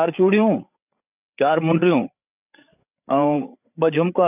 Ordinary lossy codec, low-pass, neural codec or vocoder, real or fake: none; 3.6 kHz; codec, 16 kHz, 8 kbps, FreqCodec, larger model; fake